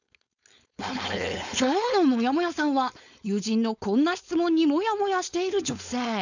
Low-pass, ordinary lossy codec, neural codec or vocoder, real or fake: 7.2 kHz; none; codec, 16 kHz, 4.8 kbps, FACodec; fake